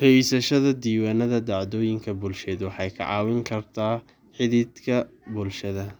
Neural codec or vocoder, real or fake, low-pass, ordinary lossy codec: none; real; 19.8 kHz; none